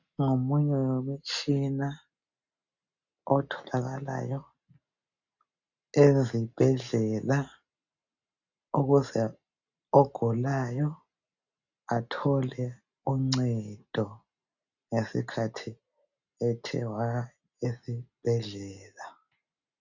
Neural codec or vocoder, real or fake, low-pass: none; real; 7.2 kHz